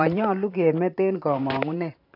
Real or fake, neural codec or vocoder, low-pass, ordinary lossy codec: fake; vocoder, 44.1 kHz, 128 mel bands every 512 samples, BigVGAN v2; 5.4 kHz; AAC, 48 kbps